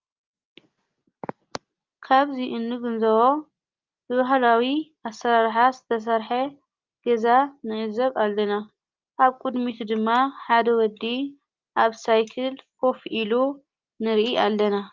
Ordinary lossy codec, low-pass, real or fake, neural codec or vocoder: Opus, 24 kbps; 7.2 kHz; real; none